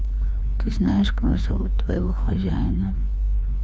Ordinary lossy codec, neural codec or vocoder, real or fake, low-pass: none; codec, 16 kHz, 2 kbps, FreqCodec, larger model; fake; none